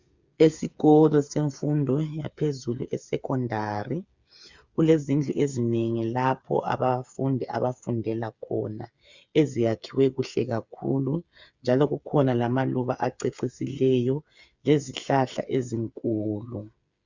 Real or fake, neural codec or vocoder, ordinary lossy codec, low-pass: fake; codec, 16 kHz, 8 kbps, FreqCodec, smaller model; Opus, 64 kbps; 7.2 kHz